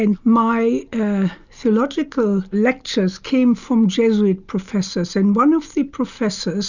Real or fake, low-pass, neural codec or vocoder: real; 7.2 kHz; none